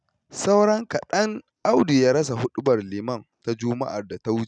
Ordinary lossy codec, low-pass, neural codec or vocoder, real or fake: none; 9.9 kHz; none; real